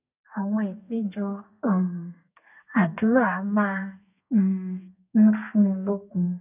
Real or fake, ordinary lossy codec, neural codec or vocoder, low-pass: fake; none; codec, 32 kHz, 1.9 kbps, SNAC; 3.6 kHz